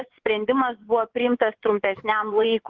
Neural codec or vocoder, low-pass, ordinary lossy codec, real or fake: vocoder, 24 kHz, 100 mel bands, Vocos; 7.2 kHz; Opus, 16 kbps; fake